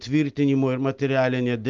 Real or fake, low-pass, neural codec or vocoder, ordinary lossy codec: real; 7.2 kHz; none; Opus, 32 kbps